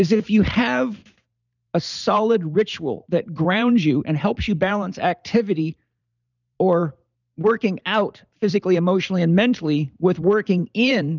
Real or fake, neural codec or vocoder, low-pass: fake; vocoder, 44.1 kHz, 128 mel bands every 512 samples, BigVGAN v2; 7.2 kHz